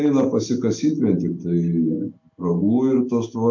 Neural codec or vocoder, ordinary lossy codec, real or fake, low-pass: none; MP3, 64 kbps; real; 7.2 kHz